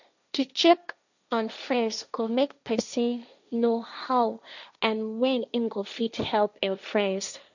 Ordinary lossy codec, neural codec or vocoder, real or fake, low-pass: none; codec, 16 kHz, 1.1 kbps, Voila-Tokenizer; fake; 7.2 kHz